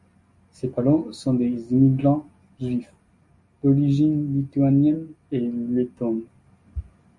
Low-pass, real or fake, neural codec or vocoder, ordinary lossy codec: 10.8 kHz; real; none; MP3, 48 kbps